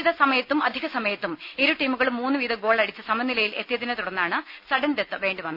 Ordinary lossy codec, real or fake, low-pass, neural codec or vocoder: none; real; 5.4 kHz; none